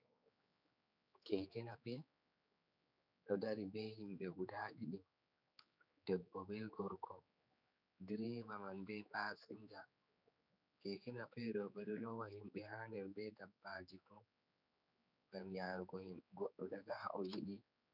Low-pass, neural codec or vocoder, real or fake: 5.4 kHz; codec, 16 kHz, 4 kbps, X-Codec, HuBERT features, trained on general audio; fake